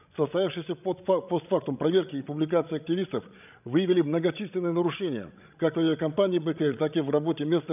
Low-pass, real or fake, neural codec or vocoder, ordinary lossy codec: 3.6 kHz; fake; codec, 16 kHz, 16 kbps, FreqCodec, larger model; none